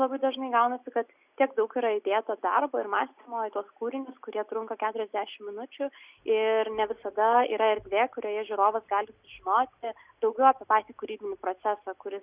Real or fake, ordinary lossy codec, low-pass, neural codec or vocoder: real; Opus, 64 kbps; 3.6 kHz; none